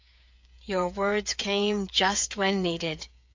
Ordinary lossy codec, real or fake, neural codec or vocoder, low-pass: MP3, 64 kbps; fake; codec, 16 kHz, 8 kbps, FreqCodec, smaller model; 7.2 kHz